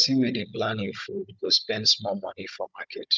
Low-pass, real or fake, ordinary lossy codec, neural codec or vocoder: none; fake; none; codec, 16 kHz, 16 kbps, FunCodec, trained on Chinese and English, 50 frames a second